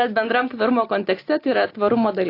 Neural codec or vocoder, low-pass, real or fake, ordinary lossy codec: none; 5.4 kHz; real; AAC, 32 kbps